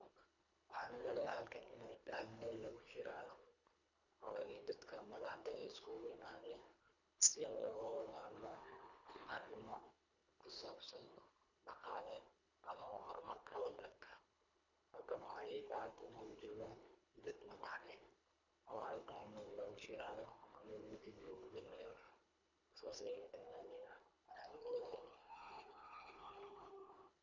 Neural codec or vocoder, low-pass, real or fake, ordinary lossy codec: codec, 24 kHz, 1.5 kbps, HILCodec; 7.2 kHz; fake; none